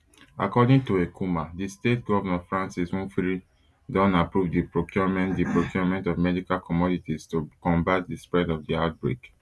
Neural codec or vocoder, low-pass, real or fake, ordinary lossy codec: none; none; real; none